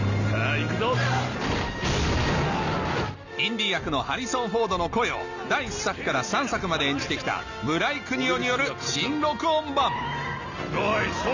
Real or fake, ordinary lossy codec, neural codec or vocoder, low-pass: real; none; none; 7.2 kHz